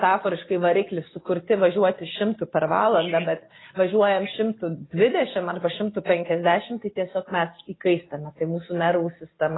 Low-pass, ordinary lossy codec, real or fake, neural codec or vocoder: 7.2 kHz; AAC, 16 kbps; real; none